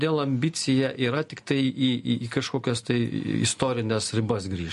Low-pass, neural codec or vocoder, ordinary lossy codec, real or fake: 14.4 kHz; none; MP3, 48 kbps; real